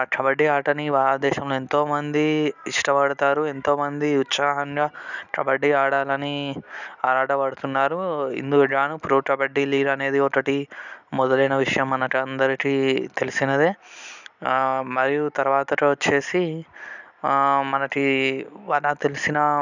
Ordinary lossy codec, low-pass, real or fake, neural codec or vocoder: none; 7.2 kHz; real; none